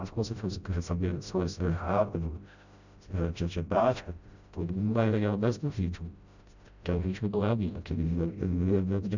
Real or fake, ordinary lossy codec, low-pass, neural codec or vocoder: fake; none; 7.2 kHz; codec, 16 kHz, 0.5 kbps, FreqCodec, smaller model